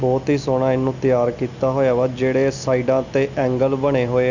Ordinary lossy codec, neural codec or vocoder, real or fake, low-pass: none; none; real; 7.2 kHz